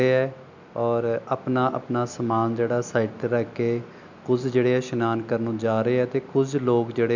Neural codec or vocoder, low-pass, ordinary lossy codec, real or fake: none; 7.2 kHz; none; real